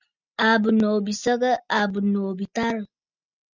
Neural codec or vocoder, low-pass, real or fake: none; 7.2 kHz; real